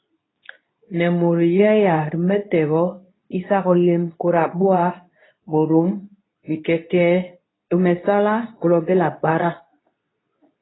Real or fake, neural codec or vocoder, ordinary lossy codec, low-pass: fake; codec, 24 kHz, 0.9 kbps, WavTokenizer, medium speech release version 2; AAC, 16 kbps; 7.2 kHz